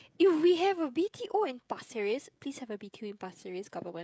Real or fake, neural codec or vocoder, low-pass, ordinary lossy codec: fake; codec, 16 kHz, 16 kbps, FreqCodec, smaller model; none; none